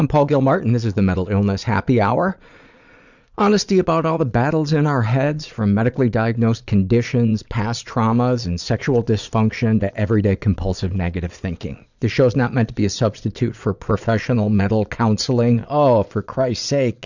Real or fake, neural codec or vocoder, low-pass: fake; vocoder, 22.05 kHz, 80 mel bands, Vocos; 7.2 kHz